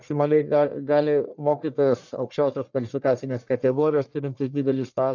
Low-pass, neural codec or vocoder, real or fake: 7.2 kHz; codec, 44.1 kHz, 1.7 kbps, Pupu-Codec; fake